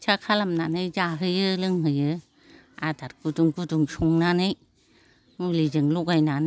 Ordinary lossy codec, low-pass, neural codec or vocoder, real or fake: none; none; none; real